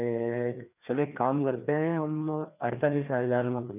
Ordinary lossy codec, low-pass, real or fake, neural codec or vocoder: none; 3.6 kHz; fake; codec, 16 kHz, 2 kbps, FreqCodec, larger model